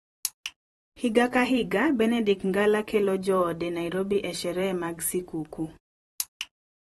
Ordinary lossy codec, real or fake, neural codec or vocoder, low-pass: AAC, 32 kbps; fake; vocoder, 44.1 kHz, 128 mel bands every 512 samples, BigVGAN v2; 19.8 kHz